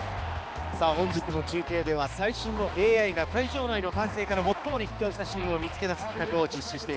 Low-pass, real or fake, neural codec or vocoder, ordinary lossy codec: none; fake; codec, 16 kHz, 2 kbps, X-Codec, HuBERT features, trained on balanced general audio; none